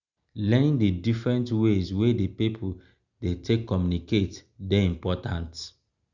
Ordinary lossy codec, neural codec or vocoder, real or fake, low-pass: Opus, 64 kbps; none; real; 7.2 kHz